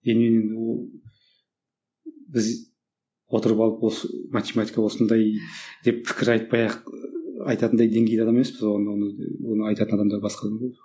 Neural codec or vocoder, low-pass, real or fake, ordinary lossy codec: none; none; real; none